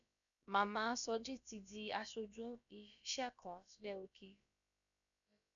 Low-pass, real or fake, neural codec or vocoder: 7.2 kHz; fake; codec, 16 kHz, about 1 kbps, DyCAST, with the encoder's durations